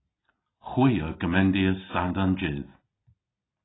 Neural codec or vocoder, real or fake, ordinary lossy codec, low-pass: none; real; AAC, 16 kbps; 7.2 kHz